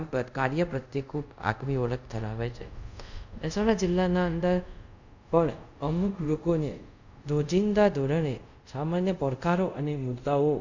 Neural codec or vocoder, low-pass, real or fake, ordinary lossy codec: codec, 24 kHz, 0.5 kbps, DualCodec; 7.2 kHz; fake; none